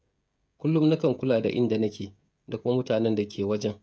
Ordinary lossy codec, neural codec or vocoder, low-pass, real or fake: none; codec, 16 kHz, 16 kbps, FreqCodec, smaller model; none; fake